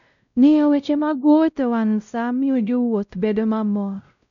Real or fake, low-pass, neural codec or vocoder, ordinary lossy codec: fake; 7.2 kHz; codec, 16 kHz, 0.5 kbps, X-Codec, WavLM features, trained on Multilingual LibriSpeech; none